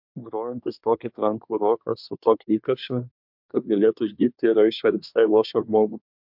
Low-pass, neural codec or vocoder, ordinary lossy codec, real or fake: 5.4 kHz; codec, 24 kHz, 1 kbps, SNAC; MP3, 48 kbps; fake